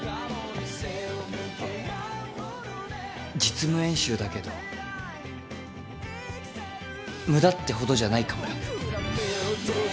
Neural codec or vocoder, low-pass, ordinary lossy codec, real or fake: none; none; none; real